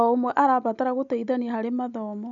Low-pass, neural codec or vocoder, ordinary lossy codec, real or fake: 7.2 kHz; none; none; real